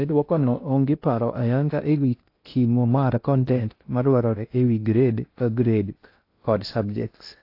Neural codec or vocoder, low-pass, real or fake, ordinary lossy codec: codec, 16 kHz in and 24 kHz out, 0.6 kbps, FocalCodec, streaming, 4096 codes; 5.4 kHz; fake; AAC, 32 kbps